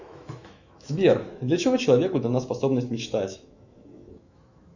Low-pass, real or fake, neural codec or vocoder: 7.2 kHz; fake; autoencoder, 48 kHz, 128 numbers a frame, DAC-VAE, trained on Japanese speech